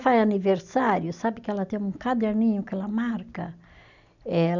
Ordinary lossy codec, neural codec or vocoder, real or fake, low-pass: none; none; real; 7.2 kHz